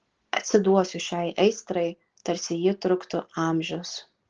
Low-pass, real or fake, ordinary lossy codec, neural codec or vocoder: 7.2 kHz; real; Opus, 16 kbps; none